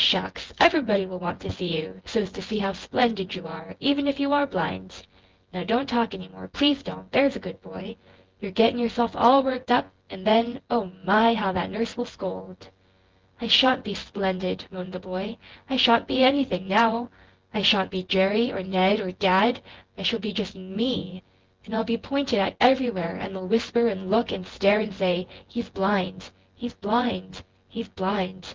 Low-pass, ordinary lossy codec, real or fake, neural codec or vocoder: 7.2 kHz; Opus, 16 kbps; fake; vocoder, 24 kHz, 100 mel bands, Vocos